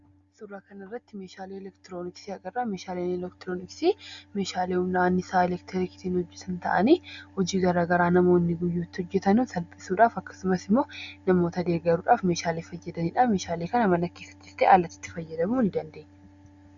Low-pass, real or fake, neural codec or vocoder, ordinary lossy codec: 7.2 kHz; real; none; Opus, 64 kbps